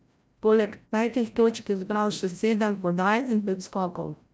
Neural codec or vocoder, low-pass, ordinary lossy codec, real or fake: codec, 16 kHz, 0.5 kbps, FreqCodec, larger model; none; none; fake